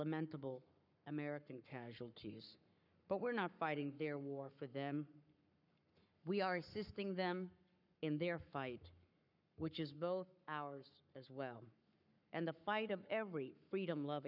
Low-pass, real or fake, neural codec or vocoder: 5.4 kHz; fake; codec, 44.1 kHz, 7.8 kbps, Pupu-Codec